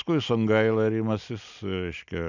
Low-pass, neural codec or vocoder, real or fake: 7.2 kHz; none; real